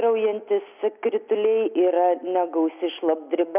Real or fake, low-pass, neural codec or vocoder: real; 3.6 kHz; none